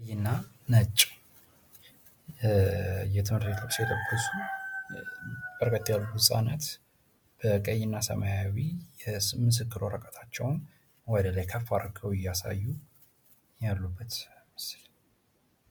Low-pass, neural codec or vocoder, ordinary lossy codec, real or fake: 19.8 kHz; none; MP3, 96 kbps; real